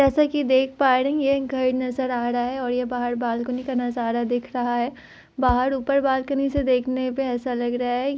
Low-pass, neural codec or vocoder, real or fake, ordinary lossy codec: none; none; real; none